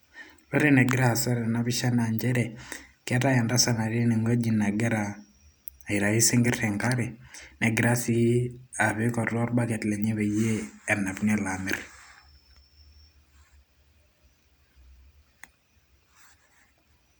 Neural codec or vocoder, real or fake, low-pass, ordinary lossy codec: none; real; none; none